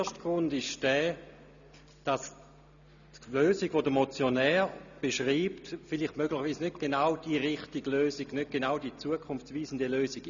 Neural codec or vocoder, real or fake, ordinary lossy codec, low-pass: none; real; none; 7.2 kHz